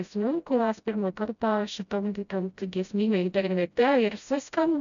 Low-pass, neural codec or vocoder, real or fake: 7.2 kHz; codec, 16 kHz, 0.5 kbps, FreqCodec, smaller model; fake